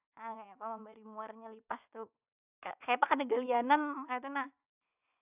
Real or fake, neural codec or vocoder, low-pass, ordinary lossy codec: fake; vocoder, 24 kHz, 100 mel bands, Vocos; 3.6 kHz; none